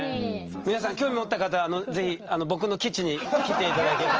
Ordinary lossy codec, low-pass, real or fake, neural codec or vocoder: Opus, 24 kbps; 7.2 kHz; real; none